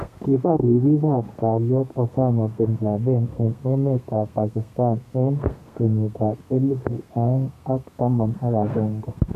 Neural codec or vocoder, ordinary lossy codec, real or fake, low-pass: codec, 32 kHz, 1.9 kbps, SNAC; none; fake; 14.4 kHz